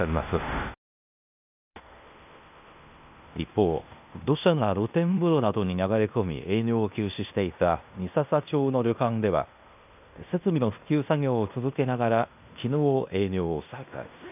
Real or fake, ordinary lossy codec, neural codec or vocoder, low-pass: fake; none; codec, 16 kHz in and 24 kHz out, 0.9 kbps, LongCat-Audio-Codec, fine tuned four codebook decoder; 3.6 kHz